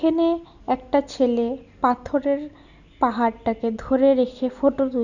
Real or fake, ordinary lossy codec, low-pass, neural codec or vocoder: real; none; 7.2 kHz; none